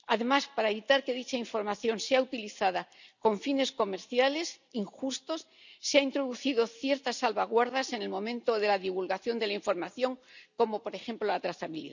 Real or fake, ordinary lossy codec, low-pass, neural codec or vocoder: real; none; 7.2 kHz; none